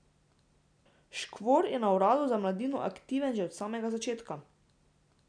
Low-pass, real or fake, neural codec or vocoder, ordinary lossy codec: 9.9 kHz; real; none; none